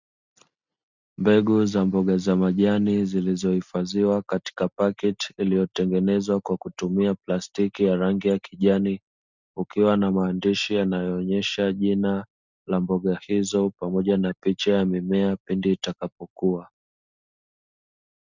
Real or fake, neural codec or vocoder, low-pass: real; none; 7.2 kHz